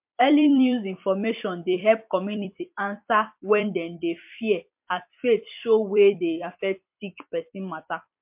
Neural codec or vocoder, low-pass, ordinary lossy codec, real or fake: vocoder, 44.1 kHz, 128 mel bands every 256 samples, BigVGAN v2; 3.6 kHz; MP3, 32 kbps; fake